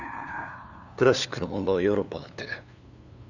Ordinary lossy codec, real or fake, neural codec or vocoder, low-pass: none; fake; codec, 16 kHz, 2 kbps, FunCodec, trained on LibriTTS, 25 frames a second; 7.2 kHz